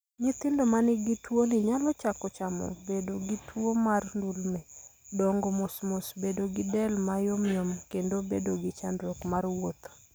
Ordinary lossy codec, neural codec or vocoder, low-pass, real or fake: none; none; none; real